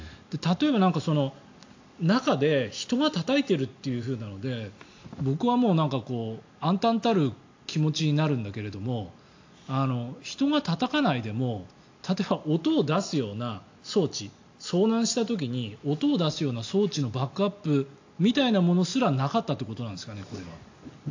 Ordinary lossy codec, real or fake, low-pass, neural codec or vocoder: AAC, 48 kbps; real; 7.2 kHz; none